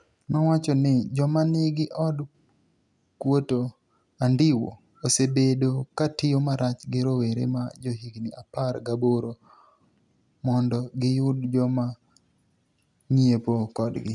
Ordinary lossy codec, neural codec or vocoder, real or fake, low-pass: none; none; real; 10.8 kHz